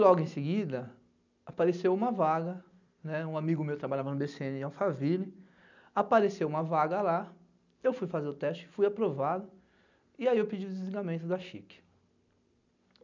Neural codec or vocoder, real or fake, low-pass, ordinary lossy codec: none; real; 7.2 kHz; none